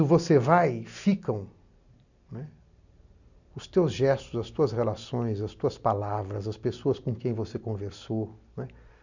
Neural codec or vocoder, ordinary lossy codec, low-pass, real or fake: none; none; 7.2 kHz; real